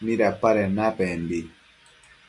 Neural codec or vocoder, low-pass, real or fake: none; 10.8 kHz; real